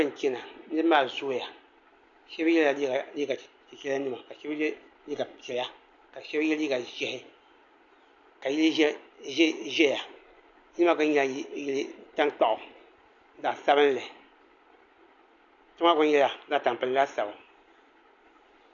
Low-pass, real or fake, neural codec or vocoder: 7.2 kHz; real; none